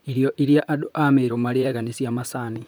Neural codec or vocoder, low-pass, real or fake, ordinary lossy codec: vocoder, 44.1 kHz, 128 mel bands, Pupu-Vocoder; none; fake; none